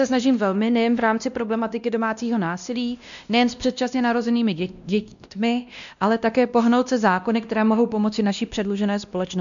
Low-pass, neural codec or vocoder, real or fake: 7.2 kHz; codec, 16 kHz, 1 kbps, X-Codec, WavLM features, trained on Multilingual LibriSpeech; fake